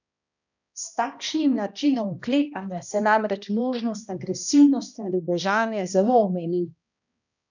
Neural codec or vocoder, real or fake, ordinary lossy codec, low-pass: codec, 16 kHz, 1 kbps, X-Codec, HuBERT features, trained on balanced general audio; fake; none; 7.2 kHz